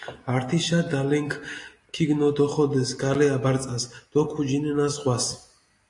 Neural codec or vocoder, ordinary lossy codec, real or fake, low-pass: none; AAC, 48 kbps; real; 10.8 kHz